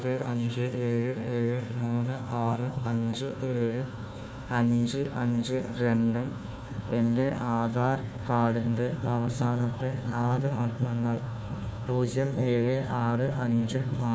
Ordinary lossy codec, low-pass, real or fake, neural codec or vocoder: none; none; fake; codec, 16 kHz, 1 kbps, FunCodec, trained on Chinese and English, 50 frames a second